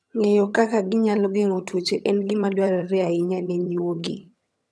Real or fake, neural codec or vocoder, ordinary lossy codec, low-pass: fake; vocoder, 22.05 kHz, 80 mel bands, HiFi-GAN; none; none